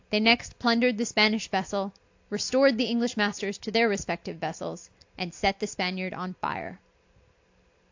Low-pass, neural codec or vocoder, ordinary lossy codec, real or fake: 7.2 kHz; none; AAC, 48 kbps; real